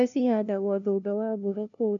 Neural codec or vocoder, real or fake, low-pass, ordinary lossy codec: codec, 16 kHz, 0.5 kbps, FunCodec, trained on LibriTTS, 25 frames a second; fake; 7.2 kHz; none